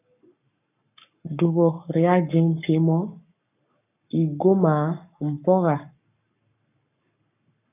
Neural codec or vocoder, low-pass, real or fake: codec, 44.1 kHz, 7.8 kbps, Pupu-Codec; 3.6 kHz; fake